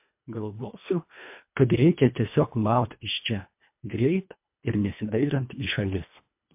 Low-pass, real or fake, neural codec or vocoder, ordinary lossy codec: 3.6 kHz; fake; codec, 24 kHz, 1.5 kbps, HILCodec; MP3, 32 kbps